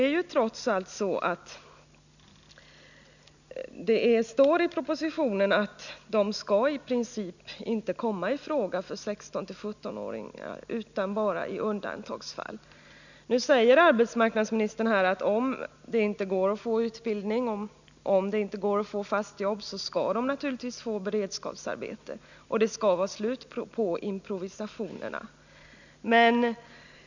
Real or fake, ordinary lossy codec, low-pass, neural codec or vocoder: real; none; 7.2 kHz; none